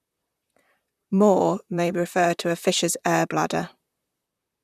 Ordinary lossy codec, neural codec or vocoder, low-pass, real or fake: none; vocoder, 44.1 kHz, 128 mel bands, Pupu-Vocoder; 14.4 kHz; fake